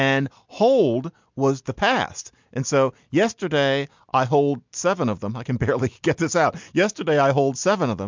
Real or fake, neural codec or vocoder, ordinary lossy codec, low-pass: real; none; MP3, 64 kbps; 7.2 kHz